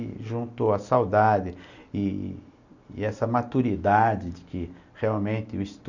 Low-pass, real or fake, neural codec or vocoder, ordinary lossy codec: 7.2 kHz; real; none; none